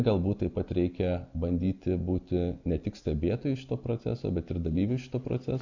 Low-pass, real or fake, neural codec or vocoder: 7.2 kHz; real; none